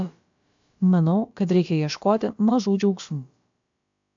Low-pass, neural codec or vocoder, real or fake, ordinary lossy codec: 7.2 kHz; codec, 16 kHz, about 1 kbps, DyCAST, with the encoder's durations; fake; AAC, 64 kbps